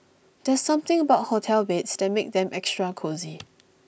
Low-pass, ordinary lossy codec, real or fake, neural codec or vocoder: none; none; real; none